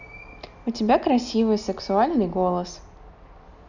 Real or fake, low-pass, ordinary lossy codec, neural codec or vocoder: real; 7.2 kHz; none; none